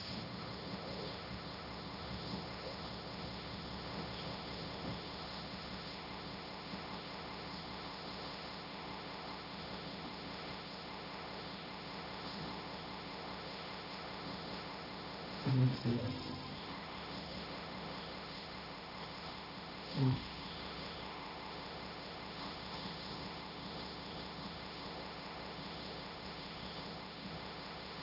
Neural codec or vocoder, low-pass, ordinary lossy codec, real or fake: codec, 16 kHz, 1.1 kbps, Voila-Tokenizer; 5.4 kHz; none; fake